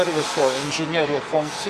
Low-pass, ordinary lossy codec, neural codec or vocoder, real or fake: 14.4 kHz; Opus, 64 kbps; codec, 44.1 kHz, 2.6 kbps, SNAC; fake